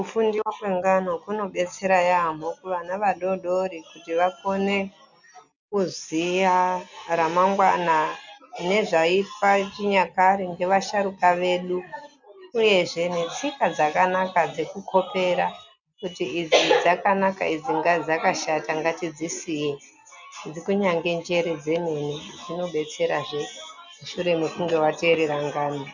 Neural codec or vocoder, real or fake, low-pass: none; real; 7.2 kHz